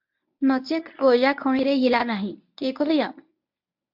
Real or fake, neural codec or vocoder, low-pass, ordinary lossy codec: fake; codec, 24 kHz, 0.9 kbps, WavTokenizer, medium speech release version 1; 5.4 kHz; MP3, 48 kbps